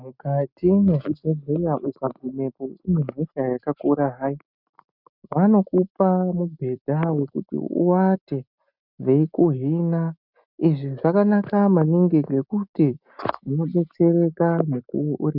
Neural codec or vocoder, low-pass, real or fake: none; 5.4 kHz; real